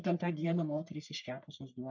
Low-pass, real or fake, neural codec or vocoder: 7.2 kHz; fake; codec, 44.1 kHz, 3.4 kbps, Pupu-Codec